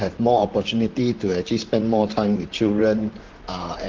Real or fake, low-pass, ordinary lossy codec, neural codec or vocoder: fake; 7.2 kHz; Opus, 16 kbps; vocoder, 44.1 kHz, 128 mel bands, Pupu-Vocoder